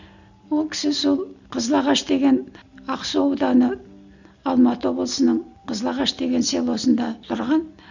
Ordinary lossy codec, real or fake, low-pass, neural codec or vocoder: none; real; 7.2 kHz; none